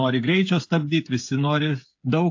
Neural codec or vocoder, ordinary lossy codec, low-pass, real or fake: codec, 16 kHz, 8 kbps, FreqCodec, smaller model; AAC, 48 kbps; 7.2 kHz; fake